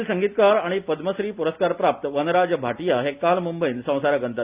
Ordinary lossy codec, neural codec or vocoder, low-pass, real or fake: Opus, 64 kbps; none; 3.6 kHz; real